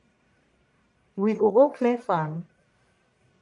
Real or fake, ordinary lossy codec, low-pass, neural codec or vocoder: fake; MP3, 96 kbps; 10.8 kHz; codec, 44.1 kHz, 1.7 kbps, Pupu-Codec